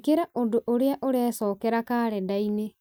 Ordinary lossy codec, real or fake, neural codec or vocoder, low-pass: none; real; none; none